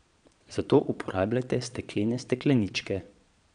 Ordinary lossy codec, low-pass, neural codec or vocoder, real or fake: none; 9.9 kHz; vocoder, 22.05 kHz, 80 mel bands, Vocos; fake